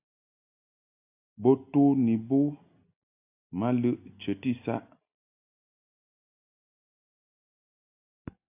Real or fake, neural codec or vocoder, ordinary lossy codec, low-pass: real; none; AAC, 24 kbps; 3.6 kHz